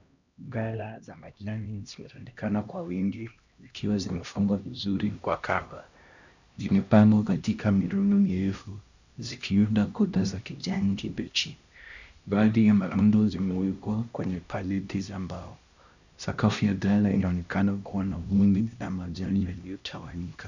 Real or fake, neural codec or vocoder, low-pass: fake; codec, 16 kHz, 1 kbps, X-Codec, HuBERT features, trained on LibriSpeech; 7.2 kHz